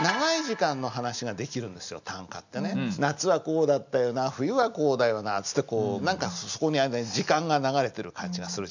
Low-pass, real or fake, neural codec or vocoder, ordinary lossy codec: 7.2 kHz; real; none; none